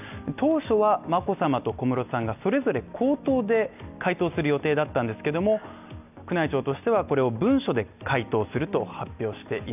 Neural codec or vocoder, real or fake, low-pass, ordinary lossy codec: none; real; 3.6 kHz; none